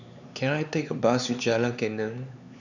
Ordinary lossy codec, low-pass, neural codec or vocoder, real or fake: none; 7.2 kHz; codec, 16 kHz, 4 kbps, X-Codec, HuBERT features, trained on LibriSpeech; fake